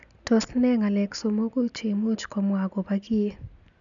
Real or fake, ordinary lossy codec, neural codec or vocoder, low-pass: real; none; none; 7.2 kHz